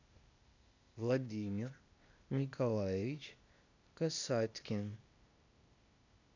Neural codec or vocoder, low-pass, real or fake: codec, 16 kHz, 0.8 kbps, ZipCodec; 7.2 kHz; fake